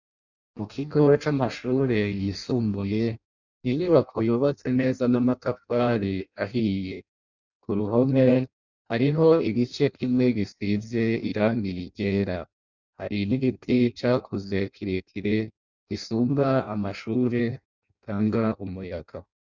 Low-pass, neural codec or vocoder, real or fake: 7.2 kHz; codec, 16 kHz in and 24 kHz out, 0.6 kbps, FireRedTTS-2 codec; fake